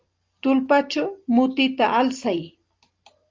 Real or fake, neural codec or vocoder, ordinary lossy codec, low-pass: real; none; Opus, 32 kbps; 7.2 kHz